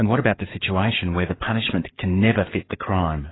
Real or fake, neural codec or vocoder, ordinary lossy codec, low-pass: fake; codec, 44.1 kHz, 7.8 kbps, DAC; AAC, 16 kbps; 7.2 kHz